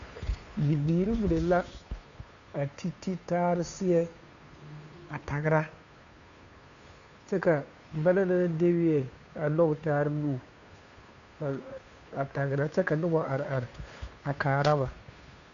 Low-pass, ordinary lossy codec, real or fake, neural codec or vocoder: 7.2 kHz; MP3, 96 kbps; fake; codec, 16 kHz, 2 kbps, FunCodec, trained on Chinese and English, 25 frames a second